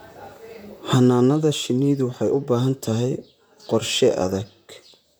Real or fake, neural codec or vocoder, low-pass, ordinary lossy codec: fake; vocoder, 44.1 kHz, 128 mel bands, Pupu-Vocoder; none; none